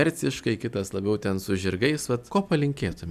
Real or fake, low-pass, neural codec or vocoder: real; 14.4 kHz; none